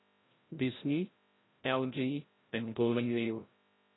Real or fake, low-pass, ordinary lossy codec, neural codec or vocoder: fake; 7.2 kHz; AAC, 16 kbps; codec, 16 kHz, 0.5 kbps, FreqCodec, larger model